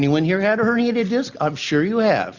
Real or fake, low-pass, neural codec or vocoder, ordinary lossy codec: real; 7.2 kHz; none; Opus, 64 kbps